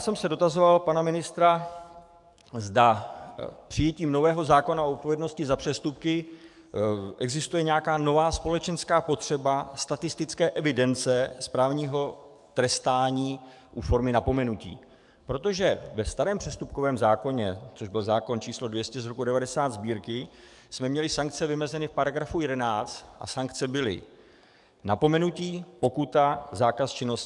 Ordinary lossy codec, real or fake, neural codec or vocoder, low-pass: MP3, 96 kbps; fake; codec, 44.1 kHz, 7.8 kbps, DAC; 10.8 kHz